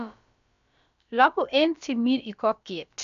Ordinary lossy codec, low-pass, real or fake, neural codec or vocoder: AAC, 96 kbps; 7.2 kHz; fake; codec, 16 kHz, about 1 kbps, DyCAST, with the encoder's durations